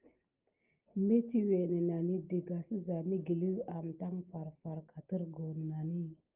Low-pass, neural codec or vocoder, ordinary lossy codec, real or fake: 3.6 kHz; none; Opus, 24 kbps; real